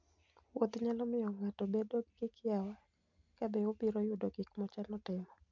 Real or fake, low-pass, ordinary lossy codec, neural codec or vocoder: real; 7.2 kHz; MP3, 64 kbps; none